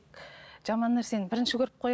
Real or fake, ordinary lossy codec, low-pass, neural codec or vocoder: real; none; none; none